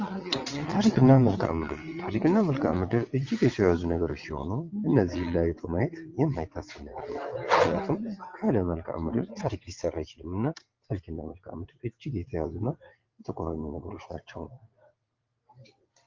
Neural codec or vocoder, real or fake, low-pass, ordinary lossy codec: vocoder, 22.05 kHz, 80 mel bands, WaveNeXt; fake; 7.2 kHz; Opus, 32 kbps